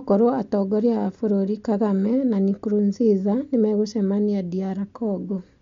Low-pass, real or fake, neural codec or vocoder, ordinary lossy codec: 7.2 kHz; real; none; MP3, 48 kbps